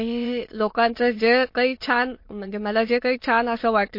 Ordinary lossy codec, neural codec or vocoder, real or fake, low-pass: MP3, 32 kbps; autoencoder, 22.05 kHz, a latent of 192 numbers a frame, VITS, trained on many speakers; fake; 5.4 kHz